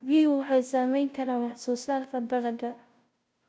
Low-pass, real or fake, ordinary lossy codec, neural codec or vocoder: none; fake; none; codec, 16 kHz, 0.5 kbps, FunCodec, trained on Chinese and English, 25 frames a second